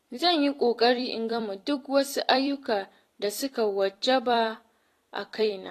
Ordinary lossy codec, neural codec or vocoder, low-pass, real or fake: AAC, 48 kbps; vocoder, 44.1 kHz, 128 mel bands every 256 samples, BigVGAN v2; 14.4 kHz; fake